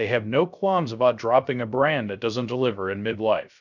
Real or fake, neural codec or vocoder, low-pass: fake; codec, 16 kHz, 0.3 kbps, FocalCodec; 7.2 kHz